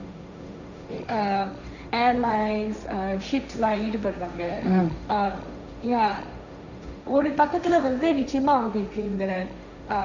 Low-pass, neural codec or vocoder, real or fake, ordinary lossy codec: 7.2 kHz; codec, 16 kHz, 1.1 kbps, Voila-Tokenizer; fake; none